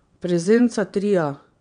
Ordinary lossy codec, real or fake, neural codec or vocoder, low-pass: none; fake; vocoder, 22.05 kHz, 80 mel bands, WaveNeXt; 9.9 kHz